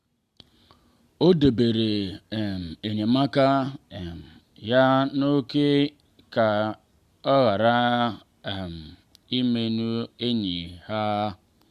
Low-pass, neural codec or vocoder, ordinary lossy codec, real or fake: 14.4 kHz; none; Opus, 64 kbps; real